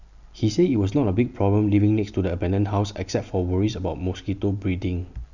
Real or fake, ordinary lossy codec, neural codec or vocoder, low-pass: real; none; none; 7.2 kHz